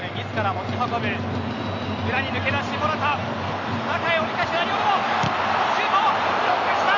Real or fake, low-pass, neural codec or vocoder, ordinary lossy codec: real; 7.2 kHz; none; none